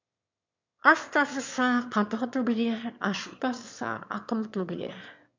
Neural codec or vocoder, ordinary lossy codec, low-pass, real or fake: autoencoder, 22.05 kHz, a latent of 192 numbers a frame, VITS, trained on one speaker; MP3, 64 kbps; 7.2 kHz; fake